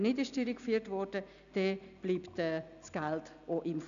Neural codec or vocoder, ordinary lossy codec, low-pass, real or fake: none; none; 7.2 kHz; real